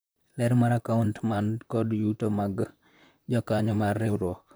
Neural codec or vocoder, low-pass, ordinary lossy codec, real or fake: vocoder, 44.1 kHz, 128 mel bands, Pupu-Vocoder; none; none; fake